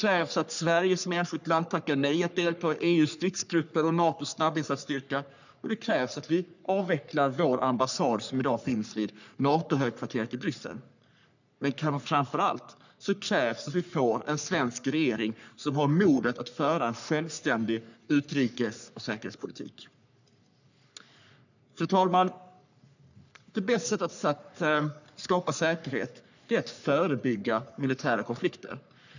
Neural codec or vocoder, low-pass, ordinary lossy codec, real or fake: codec, 44.1 kHz, 3.4 kbps, Pupu-Codec; 7.2 kHz; none; fake